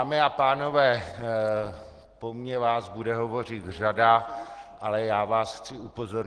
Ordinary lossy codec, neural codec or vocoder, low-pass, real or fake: Opus, 16 kbps; none; 9.9 kHz; real